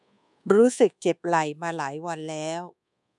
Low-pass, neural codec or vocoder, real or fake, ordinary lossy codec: 10.8 kHz; codec, 24 kHz, 1.2 kbps, DualCodec; fake; none